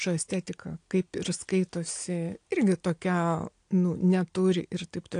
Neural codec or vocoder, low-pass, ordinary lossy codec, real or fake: none; 9.9 kHz; AAC, 48 kbps; real